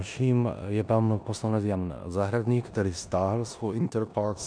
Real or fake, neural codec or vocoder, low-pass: fake; codec, 16 kHz in and 24 kHz out, 0.9 kbps, LongCat-Audio-Codec, four codebook decoder; 9.9 kHz